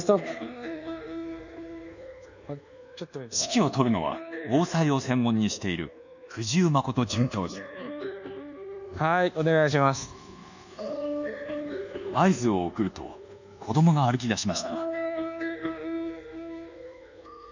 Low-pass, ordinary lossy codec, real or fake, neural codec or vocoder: 7.2 kHz; none; fake; codec, 24 kHz, 1.2 kbps, DualCodec